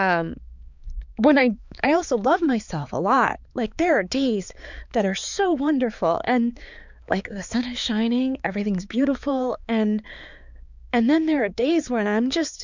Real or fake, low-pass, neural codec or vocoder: fake; 7.2 kHz; codec, 16 kHz, 4 kbps, X-Codec, HuBERT features, trained on balanced general audio